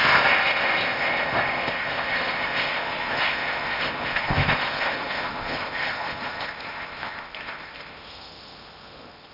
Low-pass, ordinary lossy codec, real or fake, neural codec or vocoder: 5.4 kHz; none; fake; codec, 16 kHz in and 24 kHz out, 0.8 kbps, FocalCodec, streaming, 65536 codes